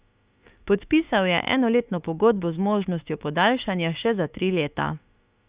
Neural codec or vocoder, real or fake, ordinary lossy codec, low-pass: autoencoder, 48 kHz, 32 numbers a frame, DAC-VAE, trained on Japanese speech; fake; Opus, 64 kbps; 3.6 kHz